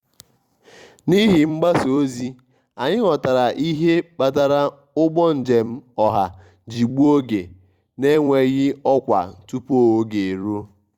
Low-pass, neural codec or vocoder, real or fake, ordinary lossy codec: 19.8 kHz; vocoder, 44.1 kHz, 128 mel bands every 256 samples, BigVGAN v2; fake; Opus, 64 kbps